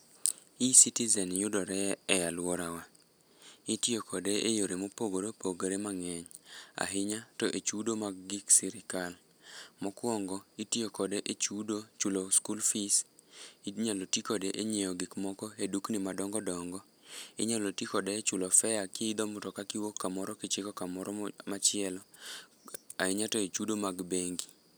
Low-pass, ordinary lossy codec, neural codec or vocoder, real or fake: none; none; none; real